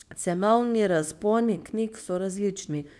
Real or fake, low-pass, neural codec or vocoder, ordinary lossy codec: fake; none; codec, 24 kHz, 0.9 kbps, WavTokenizer, small release; none